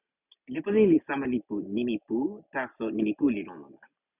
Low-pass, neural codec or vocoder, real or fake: 3.6 kHz; none; real